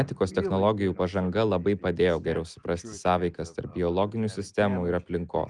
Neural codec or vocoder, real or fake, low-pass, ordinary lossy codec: none; real; 10.8 kHz; Opus, 32 kbps